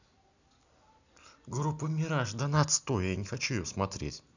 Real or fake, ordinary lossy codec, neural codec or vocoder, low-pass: real; none; none; 7.2 kHz